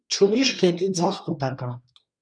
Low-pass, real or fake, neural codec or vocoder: 9.9 kHz; fake; codec, 24 kHz, 1 kbps, SNAC